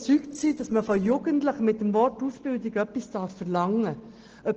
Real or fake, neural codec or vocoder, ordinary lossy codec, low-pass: real; none; Opus, 16 kbps; 7.2 kHz